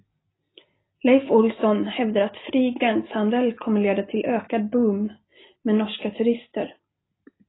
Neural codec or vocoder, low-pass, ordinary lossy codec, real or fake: none; 7.2 kHz; AAC, 16 kbps; real